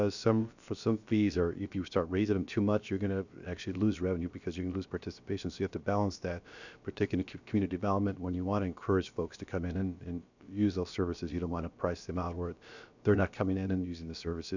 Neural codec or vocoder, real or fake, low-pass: codec, 16 kHz, about 1 kbps, DyCAST, with the encoder's durations; fake; 7.2 kHz